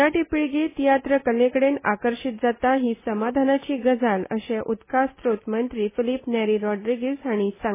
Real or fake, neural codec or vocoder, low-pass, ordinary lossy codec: real; none; 3.6 kHz; MP3, 16 kbps